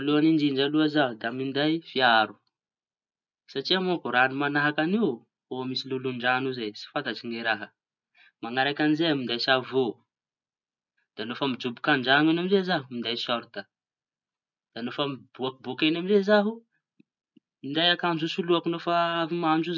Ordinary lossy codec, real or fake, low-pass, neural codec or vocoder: none; real; 7.2 kHz; none